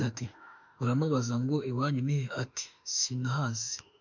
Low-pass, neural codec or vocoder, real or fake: 7.2 kHz; autoencoder, 48 kHz, 32 numbers a frame, DAC-VAE, trained on Japanese speech; fake